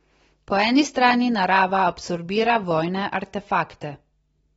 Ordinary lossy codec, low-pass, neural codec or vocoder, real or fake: AAC, 24 kbps; 19.8 kHz; none; real